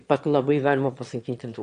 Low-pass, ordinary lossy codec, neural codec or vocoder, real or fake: 9.9 kHz; AAC, 64 kbps; autoencoder, 22.05 kHz, a latent of 192 numbers a frame, VITS, trained on one speaker; fake